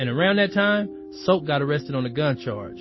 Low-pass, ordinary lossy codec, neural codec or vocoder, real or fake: 7.2 kHz; MP3, 24 kbps; none; real